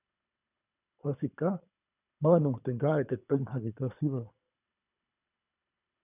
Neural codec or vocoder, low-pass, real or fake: codec, 24 kHz, 3 kbps, HILCodec; 3.6 kHz; fake